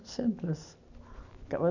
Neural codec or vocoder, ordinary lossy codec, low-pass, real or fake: none; none; 7.2 kHz; real